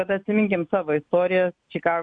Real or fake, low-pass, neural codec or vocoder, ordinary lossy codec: real; 9.9 kHz; none; MP3, 64 kbps